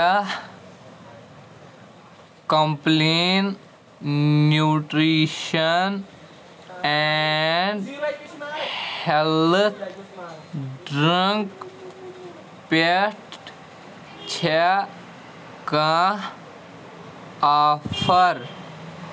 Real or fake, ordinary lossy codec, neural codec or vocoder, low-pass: real; none; none; none